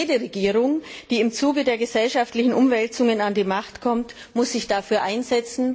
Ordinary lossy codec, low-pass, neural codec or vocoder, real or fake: none; none; none; real